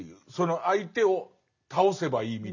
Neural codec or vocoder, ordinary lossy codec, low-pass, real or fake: none; none; 7.2 kHz; real